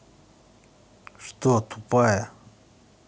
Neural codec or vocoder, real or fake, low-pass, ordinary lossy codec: none; real; none; none